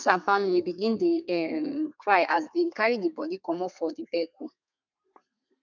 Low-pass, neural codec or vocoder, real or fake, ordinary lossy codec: 7.2 kHz; codec, 32 kHz, 1.9 kbps, SNAC; fake; none